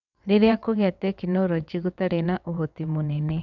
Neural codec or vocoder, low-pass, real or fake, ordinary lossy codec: vocoder, 22.05 kHz, 80 mel bands, WaveNeXt; 7.2 kHz; fake; none